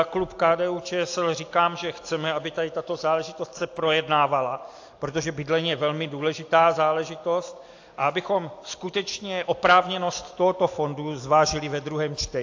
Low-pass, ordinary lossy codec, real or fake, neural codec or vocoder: 7.2 kHz; AAC, 48 kbps; real; none